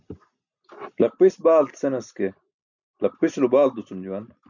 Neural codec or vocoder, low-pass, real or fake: none; 7.2 kHz; real